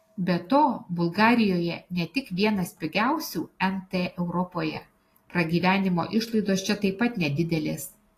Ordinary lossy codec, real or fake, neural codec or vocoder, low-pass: AAC, 48 kbps; real; none; 14.4 kHz